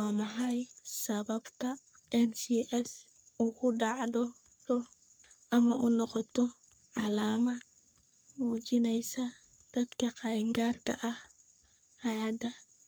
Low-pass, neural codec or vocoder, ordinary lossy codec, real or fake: none; codec, 44.1 kHz, 3.4 kbps, Pupu-Codec; none; fake